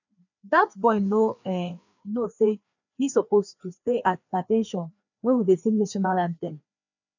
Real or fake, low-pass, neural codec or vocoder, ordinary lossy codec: fake; 7.2 kHz; codec, 16 kHz, 2 kbps, FreqCodec, larger model; none